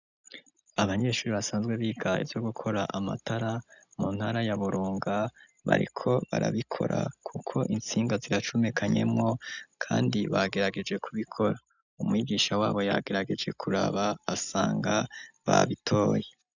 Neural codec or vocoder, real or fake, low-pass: none; real; 7.2 kHz